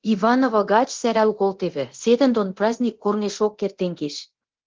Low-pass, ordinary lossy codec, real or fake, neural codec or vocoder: 7.2 kHz; Opus, 16 kbps; fake; codec, 24 kHz, 0.9 kbps, DualCodec